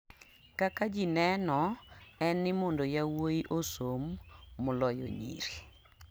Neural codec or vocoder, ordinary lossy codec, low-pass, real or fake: none; none; none; real